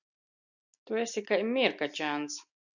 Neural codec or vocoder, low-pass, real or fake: none; 7.2 kHz; real